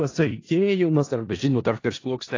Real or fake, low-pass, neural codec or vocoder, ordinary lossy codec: fake; 7.2 kHz; codec, 16 kHz in and 24 kHz out, 0.4 kbps, LongCat-Audio-Codec, four codebook decoder; AAC, 32 kbps